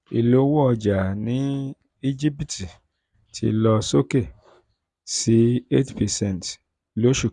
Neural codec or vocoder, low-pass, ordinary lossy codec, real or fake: none; 10.8 kHz; none; real